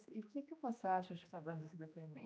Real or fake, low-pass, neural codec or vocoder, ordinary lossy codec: fake; none; codec, 16 kHz, 1 kbps, X-Codec, HuBERT features, trained on general audio; none